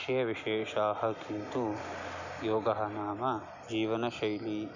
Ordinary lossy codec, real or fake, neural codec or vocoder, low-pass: none; real; none; 7.2 kHz